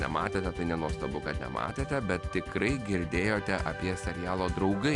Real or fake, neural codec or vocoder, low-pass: real; none; 10.8 kHz